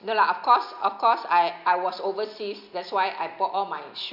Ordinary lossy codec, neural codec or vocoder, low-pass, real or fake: none; none; 5.4 kHz; real